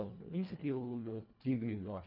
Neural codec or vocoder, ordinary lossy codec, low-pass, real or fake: codec, 24 kHz, 1.5 kbps, HILCodec; none; 5.4 kHz; fake